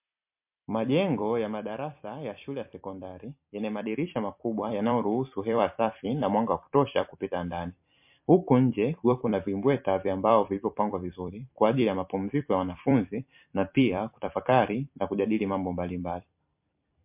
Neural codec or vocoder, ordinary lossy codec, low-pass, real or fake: vocoder, 44.1 kHz, 128 mel bands every 256 samples, BigVGAN v2; MP3, 32 kbps; 3.6 kHz; fake